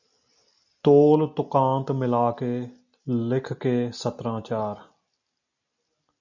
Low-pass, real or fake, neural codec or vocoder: 7.2 kHz; real; none